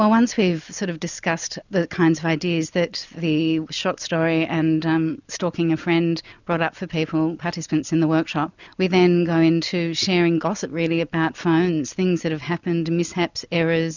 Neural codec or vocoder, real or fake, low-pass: none; real; 7.2 kHz